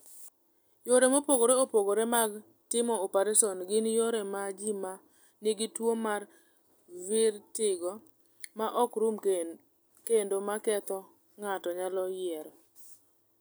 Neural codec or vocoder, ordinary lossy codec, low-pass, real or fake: none; none; none; real